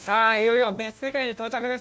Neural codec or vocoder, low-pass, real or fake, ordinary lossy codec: codec, 16 kHz, 1 kbps, FunCodec, trained on LibriTTS, 50 frames a second; none; fake; none